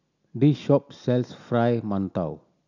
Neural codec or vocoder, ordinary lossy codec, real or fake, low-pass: none; none; real; 7.2 kHz